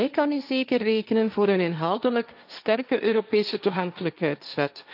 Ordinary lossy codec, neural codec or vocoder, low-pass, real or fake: none; codec, 16 kHz, 1.1 kbps, Voila-Tokenizer; 5.4 kHz; fake